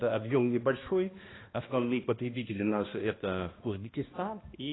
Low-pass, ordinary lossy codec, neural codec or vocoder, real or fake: 7.2 kHz; AAC, 16 kbps; codec, 16 kHz, 1 kbps, X-Codec, HuBERT features, trained on balanced general audio; fake